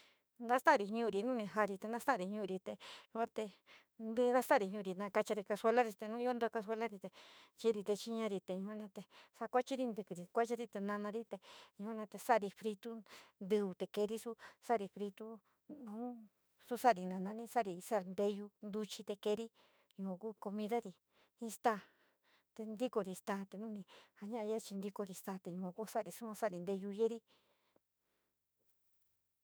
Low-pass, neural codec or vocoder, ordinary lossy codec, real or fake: none; autoencoder, 48 kHz, 32 numbers a frame, DAC-VAE, trained on Japanese speech; none; fake